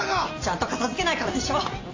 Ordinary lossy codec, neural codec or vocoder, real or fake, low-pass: AAC, 32 kbps; none; real; 7.2 kHz